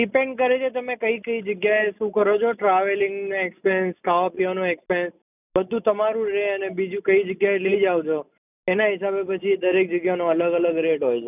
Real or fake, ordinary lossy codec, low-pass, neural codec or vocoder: real; none; 3.6 kHz; none